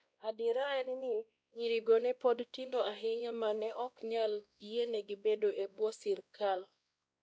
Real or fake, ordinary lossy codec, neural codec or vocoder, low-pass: fake; none; codec, 16 kHz, 1 kbps, X-Codec, WavLM features, trained on Multilingual LibriSpeech; none